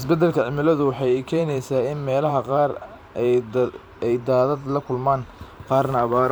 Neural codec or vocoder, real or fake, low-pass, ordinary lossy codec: vocoder, 44.1 kHz, 128 mel bands every 512 samples, BigVGAN v2; fake; none; none